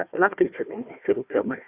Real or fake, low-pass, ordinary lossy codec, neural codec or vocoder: fake; 3.6 kHz; Opus, 64 kbps; codec, 16 kHz, 1 kbps, FunCodec, trained on Chinese and English, 50 frames a second